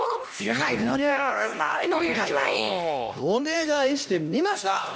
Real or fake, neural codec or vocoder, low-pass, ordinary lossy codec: fake; codec, 16 kHz, 1 kbps, X-Codec, HuBERT features, trained on LibriSpeech; none; none